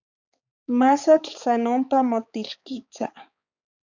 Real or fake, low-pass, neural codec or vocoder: fake; 7.2 kHz; codec, 16 kHz, 4 kbps, X-Codec, HuBERT features, trained on balanced general audio